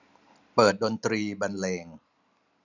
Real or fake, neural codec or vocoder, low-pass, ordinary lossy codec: real; none; 7.2 kHz; none